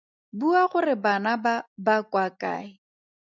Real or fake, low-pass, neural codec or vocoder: real; 7.2 kHz; none